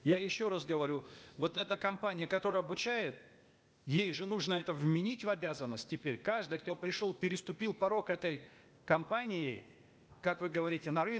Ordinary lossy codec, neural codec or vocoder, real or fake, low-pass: none; codec, 16 kHz, 0.8 kbps, ZipCodec; fake; none